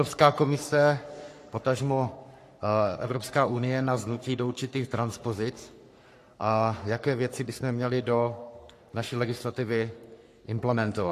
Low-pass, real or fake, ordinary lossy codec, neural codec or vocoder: 14.4 kHz; fake; AAC, 64 kbps; codec, 44.1 kHz, 3.4 kbps, Pupu-Codec